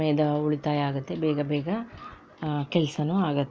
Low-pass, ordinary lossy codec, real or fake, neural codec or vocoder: none; none; real; none